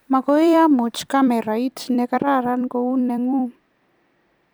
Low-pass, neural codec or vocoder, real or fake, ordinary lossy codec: none; vocoder, 44.1 kHz, 128 mel bands every 256 samples, BigVGAN v2; fake; none